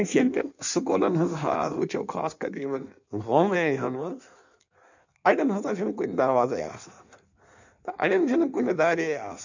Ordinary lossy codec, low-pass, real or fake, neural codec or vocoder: none; 7.2 kHz; fake; codec, 16 kHz in and 24 kHz out, 1.1 kbps, FireRedTTS-2 codec